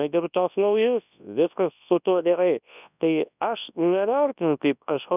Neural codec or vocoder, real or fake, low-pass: codec, 24 kHz, 0.9 kbps, WavTokenizer, large speech release; fake; 3.6 kHz